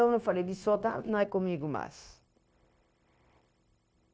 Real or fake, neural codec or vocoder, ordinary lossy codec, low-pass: fake; codec, 16 kHz, 0.9 kbps, LongCat-Audio-Codec; none; none